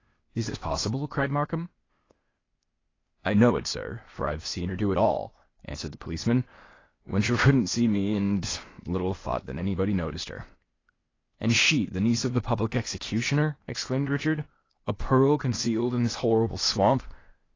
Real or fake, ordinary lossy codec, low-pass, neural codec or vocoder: fake; AAC, 32 kbps; 7.2 kHz; codec, 16 kHz, 0.8 kbps, ZipCodec